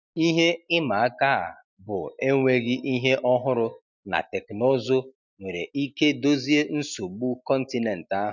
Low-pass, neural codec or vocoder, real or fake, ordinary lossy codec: 7.2 kHz; none; real; none